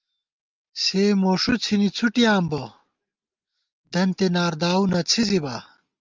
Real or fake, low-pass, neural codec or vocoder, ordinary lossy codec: real; 7.2 kHz; none; Opus, 32 kbps